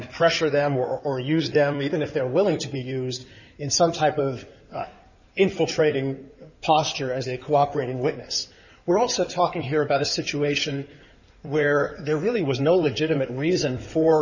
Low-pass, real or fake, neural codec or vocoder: 7.2 kHz; fake; codec, 16 kHz in and 24 kHz out, 2.2 kbps, FireRedTTS-2 codec